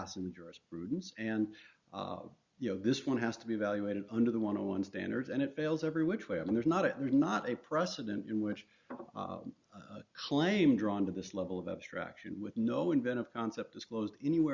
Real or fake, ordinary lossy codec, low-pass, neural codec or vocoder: real; Opus, 64 kbps; 7.2 kHz; none